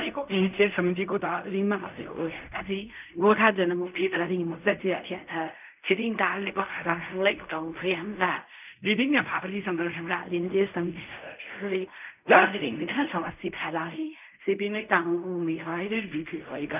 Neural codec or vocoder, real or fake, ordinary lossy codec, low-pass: codec, 16 kHz in and 24 kHz out, 0.4 kbps, LongCat-Audio-Codec, fine tuned four codebook decoder; fake; none; 3.6 kHz